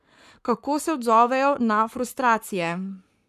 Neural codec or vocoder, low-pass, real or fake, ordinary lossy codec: codec, 44.1 kHz, 7.8 kbps, Pupu-Codec; 14.4 kHz; fake; MP3, 96 kbps